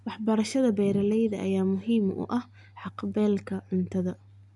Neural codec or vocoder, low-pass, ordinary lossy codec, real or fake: none; 10.8 kHz; none; real